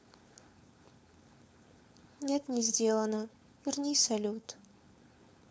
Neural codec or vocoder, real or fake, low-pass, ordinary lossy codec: codec, 16 kHz, 4.8 kbps, FACodec; fake; none; none